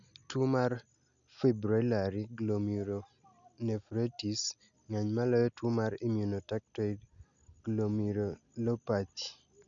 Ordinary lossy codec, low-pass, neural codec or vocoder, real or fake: none; 7.2 kHz; none; real